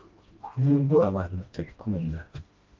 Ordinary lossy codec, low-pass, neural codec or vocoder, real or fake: Opus, 24 kbps; 7.2 kHz; codec, 16 kHz, 1 kbps, FreqCodec, smaller model; fake